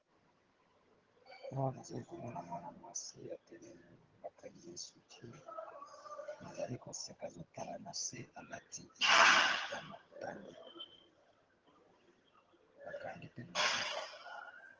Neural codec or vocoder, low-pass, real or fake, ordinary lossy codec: vocoder, 22.05 kHz, 80 mel bands, HiFi-GAN; 7.2 kHz; fake; Opus, 16 kbps